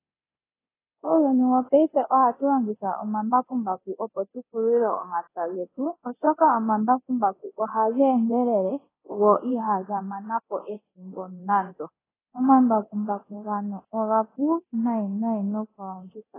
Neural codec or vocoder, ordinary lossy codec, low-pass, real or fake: codec, 24 kHz, 0.9 kbps, DualCodec; AAC, 16 kbps; 3.6 kHz; fake